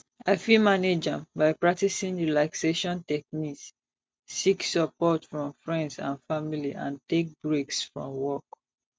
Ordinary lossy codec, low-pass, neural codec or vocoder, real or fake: none; none; none; real